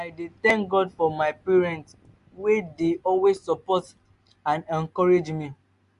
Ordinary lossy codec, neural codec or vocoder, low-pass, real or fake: MP3, 64 kbps; none; 10.8 kHz; real